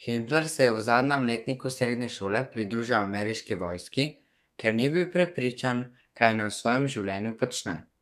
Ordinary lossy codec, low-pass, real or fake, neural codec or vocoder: none; 14.4 kHz; fake; codec, 32 kHz, 1.9 kbps, SNAC